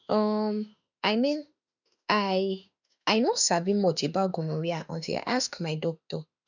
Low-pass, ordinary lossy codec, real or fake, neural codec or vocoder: 7.2 kHz; none; fake; autoencoder, 48 kHz, 32 numbers a frame, DAC-VAE, trained on Japanese speech